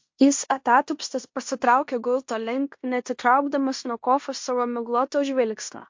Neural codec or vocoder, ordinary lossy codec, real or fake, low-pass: codec, 16 kHz in and 24 kHz out, 0.9 kbps, LongCat-Audio-Codec, fine tuned four codebook decoder; MP3, 48 kbps; fake; 7.2 kHz